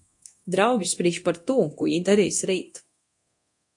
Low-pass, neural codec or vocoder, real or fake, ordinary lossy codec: 10.8 kHz; codec, 24 kHz, 0.9 kbps, DualCodec; fake; AAC, 64 kbps